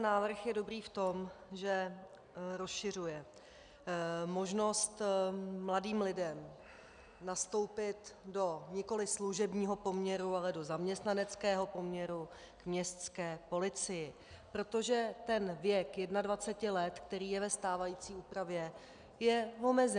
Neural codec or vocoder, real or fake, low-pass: none; real; 9.9 kHz